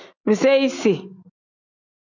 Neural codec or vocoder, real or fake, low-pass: none; real; 7.2 kHz